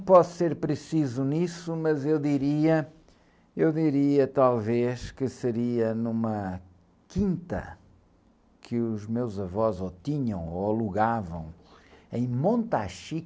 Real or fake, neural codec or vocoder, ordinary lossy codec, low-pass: real; none; none; none